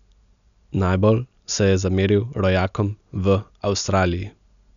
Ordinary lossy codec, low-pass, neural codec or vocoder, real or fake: none; 7.2 kHz; none; real